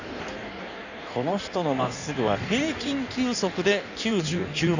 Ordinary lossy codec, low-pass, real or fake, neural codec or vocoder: none; 7.2 kHz; fake; codec, 16 kHz in and 24 kHz out, 1.1 kbps, FireRedTTS-2 codec